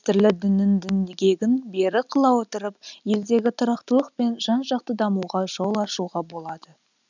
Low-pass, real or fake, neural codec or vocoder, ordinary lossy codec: 7.2 kHz; real; none; none